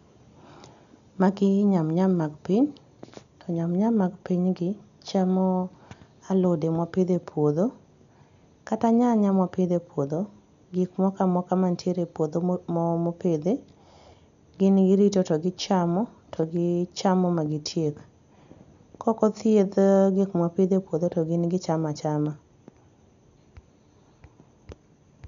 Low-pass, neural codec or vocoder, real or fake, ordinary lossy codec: 7.2 kHz; none; real; none